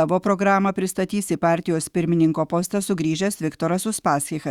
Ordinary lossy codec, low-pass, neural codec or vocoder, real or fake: Opus, 64 kbps; 19.8 kHz; autoencoder, 48 kHz, 128 numbers a frame, DAC-VAE, trained on Japanese speech; fake